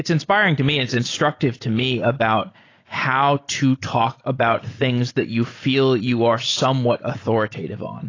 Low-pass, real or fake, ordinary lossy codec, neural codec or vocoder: 7.2 kHz; real; AAC, 32 kbps; none